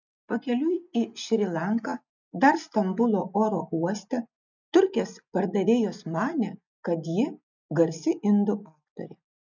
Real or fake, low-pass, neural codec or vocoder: real; 7.2 kHz; none